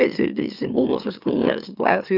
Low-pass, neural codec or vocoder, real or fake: 5.4 kHz; autoencoder, 44.1 kHz, a latent of 192 numbers a frame, MeloTTS; fake